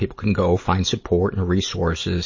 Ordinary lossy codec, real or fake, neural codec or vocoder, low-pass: MP3, 32 kbps; real; none; 7.2 kHz